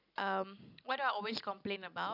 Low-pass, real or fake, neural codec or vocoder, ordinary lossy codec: 5.4 kHz; real; none; none